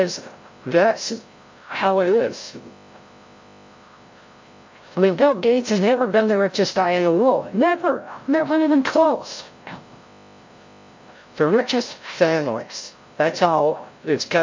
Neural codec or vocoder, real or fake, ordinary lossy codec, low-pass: codec, 16 kHz, 0.5 kbps, FreqCodec, larger model; fake; MP3, 48 kbps; 7.2 kHz